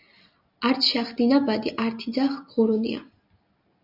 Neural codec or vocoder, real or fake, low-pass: none; real; 5.4 kHz